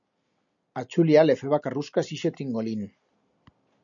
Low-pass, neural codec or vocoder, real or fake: 7.2 kHz; none; real